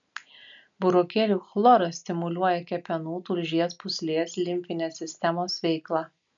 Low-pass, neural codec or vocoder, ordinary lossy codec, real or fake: 7.2 kHz; none; MP3, 96 kbps; real